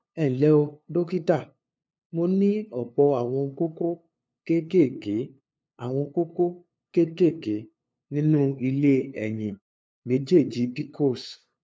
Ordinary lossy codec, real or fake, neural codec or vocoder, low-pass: none; fake; codec, 16 kHz, 2 kbps, FunCodec, trained on LibriTTS, 25 frames a second; none